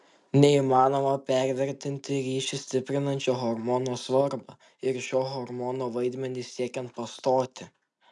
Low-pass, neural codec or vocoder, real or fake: 10.8 kHz; none; real